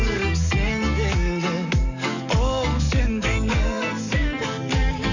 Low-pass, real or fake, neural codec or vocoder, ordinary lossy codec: 7.2 kHz; fake; codec, 44.1 kHz, 7.8 kbps, DAC; none